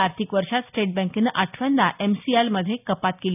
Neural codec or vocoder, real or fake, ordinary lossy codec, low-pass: none; real; none; 3.6 kHz